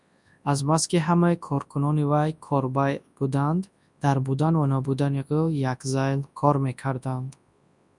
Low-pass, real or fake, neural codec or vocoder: 10.8 kHz; fake; codec, 24 kHz, 0.9 kbps, WavTokenizer, large speech release